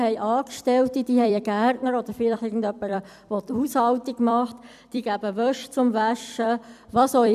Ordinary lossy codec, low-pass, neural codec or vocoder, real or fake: none; 14.4 kHz; vocoder, 44.1 kHz, 128 mel bands every 256 samples, BigVGAN v2; fake